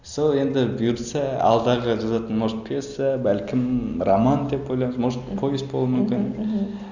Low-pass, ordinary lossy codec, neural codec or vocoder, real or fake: 7.2 kHz; Opus, 64 kbps; none; real